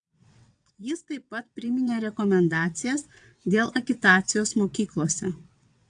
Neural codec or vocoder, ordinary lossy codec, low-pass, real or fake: vocoder, 22.05 kHz, 80 mel bands, WaveNeXt; AAC, 64 kbps; 9.9 kHz; fake